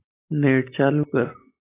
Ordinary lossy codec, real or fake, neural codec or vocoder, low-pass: AAC, 16 kbps; real; none; 3.6 kHz